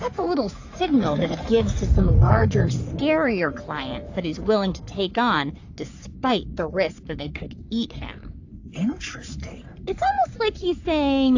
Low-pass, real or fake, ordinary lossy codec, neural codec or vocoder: 7.2 kHz; fake; AAC, 48 kbps; codec, 44.1 kHz, 3.4 kbps, Pupu-Codec